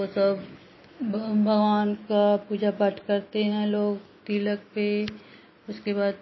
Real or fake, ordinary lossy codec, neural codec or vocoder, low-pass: real; MP3, 24 kbps; none; 7.2 kHz